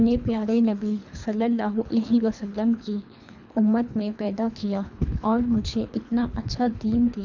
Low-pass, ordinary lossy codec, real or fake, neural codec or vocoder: 7.2 kHz; none; fake; codec, 24 kHz, 3 kbps, HILCodec